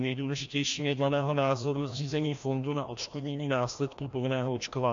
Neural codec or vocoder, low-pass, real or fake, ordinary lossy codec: codec, 16 kHz, 1 kbps, FreqCodec, larger model; 7.2 kHz; fake; AAC, 64 kbps